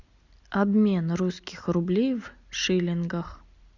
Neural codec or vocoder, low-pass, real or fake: none; 7.2 kHz; real